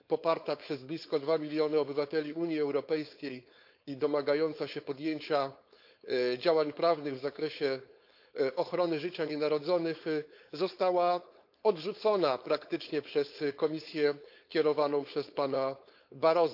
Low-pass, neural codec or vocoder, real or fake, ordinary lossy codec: 5.4 kHz; codec, 16 kHz, 4.8 kbps, FACodec; fake; AAC, 48 kbps